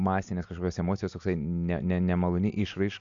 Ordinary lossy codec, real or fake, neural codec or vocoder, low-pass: AAC, 64 kbps; real; none; 7.2 kHz